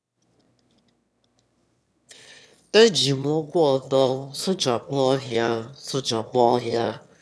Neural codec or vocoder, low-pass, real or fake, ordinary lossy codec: autoencoder, 22.05 kHz, a latent of 192 numbers a frame, VITS, trained on one speaker; none; fake; none